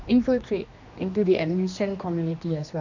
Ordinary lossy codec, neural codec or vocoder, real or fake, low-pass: none; codec, 16 kHz, 2 kbps, X-Codec, HuBERT features, trained on general audio; fake; 7.2 kHz